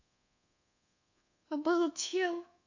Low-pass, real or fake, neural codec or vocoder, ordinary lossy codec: 7.2 kHz; fake; codec, 24 kHz, 1.2 kbps, DualCodec; AAC, 48 kbps